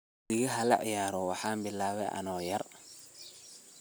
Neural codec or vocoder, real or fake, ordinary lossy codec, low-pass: none; real; none; none